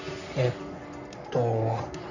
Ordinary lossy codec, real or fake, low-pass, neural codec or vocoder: none; fake; 7.2 kHz; codec, 44.1 kHz, 3.4 kbps, Pupu-Codec